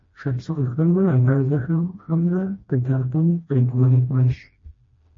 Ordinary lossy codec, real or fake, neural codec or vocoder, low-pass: MP3, 32 kbps; fake; codec, 16 kHz, 1 kbps, FreqCodec, smaller model; 7.2 kHz